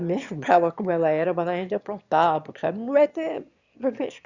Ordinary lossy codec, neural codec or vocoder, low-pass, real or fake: Opus, 64 kbps; autoencoder, 22.05 kHz, a latent of 192 numbers a frame, VITS, trained on one speaker; 7.2 kHz; fake